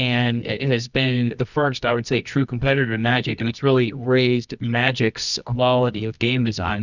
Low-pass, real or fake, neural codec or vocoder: 7.2 kHz; fake; codec, 24 kHz, 0.9 kbps, WavTokenizer, medium music audio release